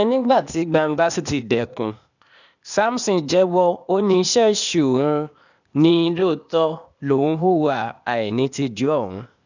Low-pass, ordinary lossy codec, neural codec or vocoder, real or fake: 7.2 kHz; none; codec, 16 kHz, 0.8 kbps, ZipCodec; fake